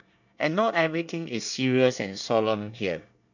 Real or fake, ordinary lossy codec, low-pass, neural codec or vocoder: fake; none; 7.2 kHz; codec, 24 kHz, 1 kbps, SNAC